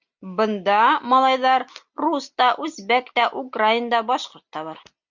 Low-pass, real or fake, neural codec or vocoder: 7.2 kHz; real; none